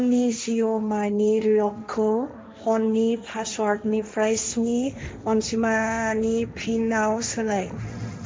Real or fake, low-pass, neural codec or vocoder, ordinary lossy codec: fake; none; codec, 16 kHz, 1.1 kbps, Voila-Tokenizer; none